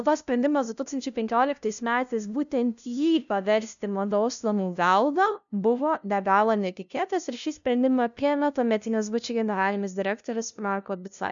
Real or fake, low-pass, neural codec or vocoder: fake; 7.2 kHz; codec, 16 kHz, 0.5 kbps, FunCodec, trained on LibriTTS, 25 frames a second